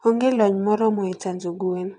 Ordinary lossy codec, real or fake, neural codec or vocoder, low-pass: none; fake; vocoder, 22.05 kHz, 80 mel bands, WaveNeXt; 9.9 kHz